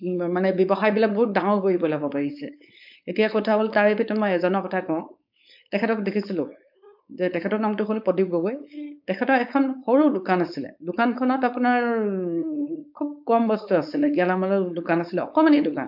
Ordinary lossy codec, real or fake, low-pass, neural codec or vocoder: AAC, 48 kbps; fake; 5.4 kHz; codec, 16 kHz, 4.8 kbps, FACodec